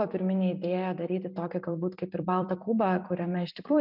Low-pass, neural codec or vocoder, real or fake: 5.4 kHz; vocoder, 44.1 kHz, 128 mel bands every 256 samples, BigVGAN v2; fake